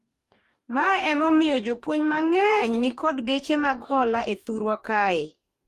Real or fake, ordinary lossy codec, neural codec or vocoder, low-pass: fake; Opus, 32 kbps; codec, 44.1 kHz, 2.6 kbps, DAC; 14.4 kHz